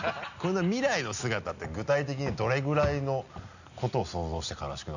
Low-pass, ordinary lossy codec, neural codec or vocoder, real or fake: 7.2 kHz; none; none; real